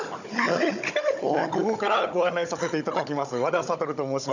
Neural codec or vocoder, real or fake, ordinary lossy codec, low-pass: codec, 16 kHz, 16 kbps, FunCodec, trained on Chinese and English, 50 frames a second; fake; none; 7.2 kHz